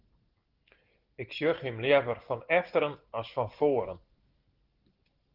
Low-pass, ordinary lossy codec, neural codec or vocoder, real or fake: 5.4 kHz; Opus, 16 kbps; none; real